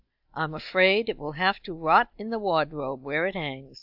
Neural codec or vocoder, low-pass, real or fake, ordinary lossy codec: none; 5.4 kHz; real; AAC, 48 kbps